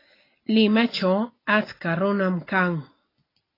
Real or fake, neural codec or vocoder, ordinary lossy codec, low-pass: real; none; AAC, 24 kbps; 5.4 kHz